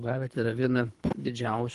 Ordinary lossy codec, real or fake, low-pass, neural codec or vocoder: Opus, 24 kbps; fake; 10.8 kHz; codec, 24 kHz, 3 kbps, HILCodec